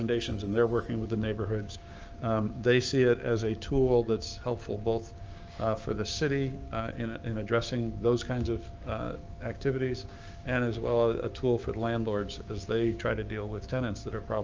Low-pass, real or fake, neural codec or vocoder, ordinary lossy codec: 7.2 kHz; fake; codec, 16 kHz, 6 kbps, DAC; Opus, 24 kbps